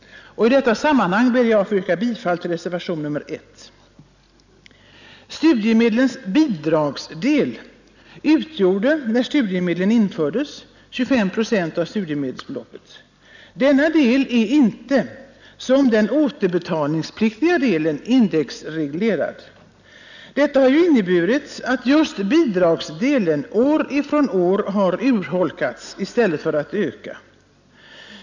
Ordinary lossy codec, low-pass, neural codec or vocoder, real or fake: none; 7.2 kHz; none; real